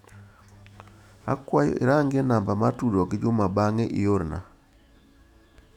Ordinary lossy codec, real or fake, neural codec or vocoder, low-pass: none; fake; autoencoder, 48 kHz, 128 numbers a frame, DAC-VAE, trained on Japanese speech; 19.8 kHz